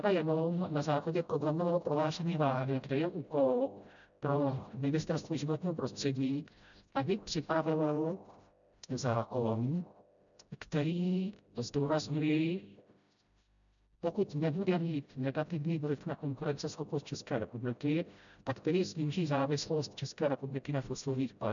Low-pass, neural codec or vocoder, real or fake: 7.2 kHz; codec, 16 kHz, 0.5 kbps, FreqCodec, smaller model; fake